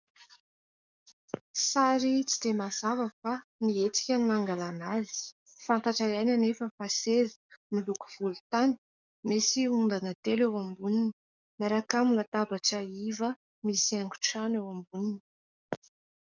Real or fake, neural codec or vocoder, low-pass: fake; codec, 44.1 kHz, 7.8 kbps, DAC; 7.2 kHz